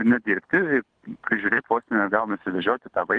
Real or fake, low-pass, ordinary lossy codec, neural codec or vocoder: fake; 9.9 kHz; Opus, 16 kbps; codec, 24 kHz, 6 kbps, HILCodec